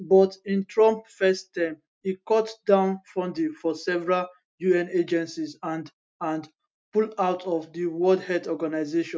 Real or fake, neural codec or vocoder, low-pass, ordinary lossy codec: real; none; none; none